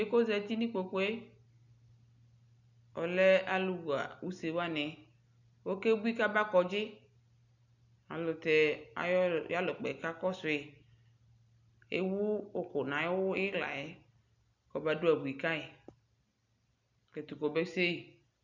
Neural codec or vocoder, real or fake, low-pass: none; real; 7.2 kHz